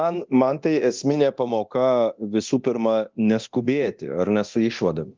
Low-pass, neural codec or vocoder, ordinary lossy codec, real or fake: 7.2 kHz; codec, 24 kHz, 0.9 kbps, DualCodec; Opus, 32 kbps; fake